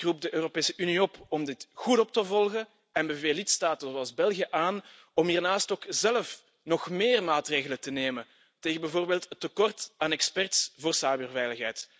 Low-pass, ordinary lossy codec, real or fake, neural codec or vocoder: none; none; real; none